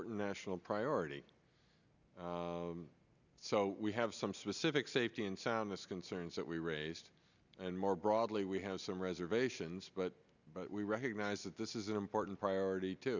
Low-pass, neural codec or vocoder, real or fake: 7.2 kHz; none; real